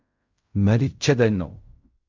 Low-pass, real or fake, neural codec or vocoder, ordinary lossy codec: 7.2 kHz; fake; codec, 16 kHz in and 24 kHz out, 0.4 kbps, LongCat-Audio-Codec, fine tuned four codebook decoder; MP3, 64 kbps